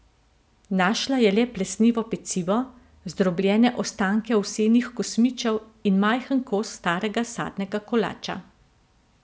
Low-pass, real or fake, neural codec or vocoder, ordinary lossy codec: none; real; none; none